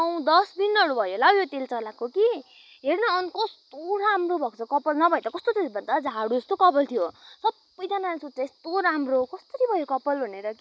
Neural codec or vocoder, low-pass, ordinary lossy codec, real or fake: none; none; none; real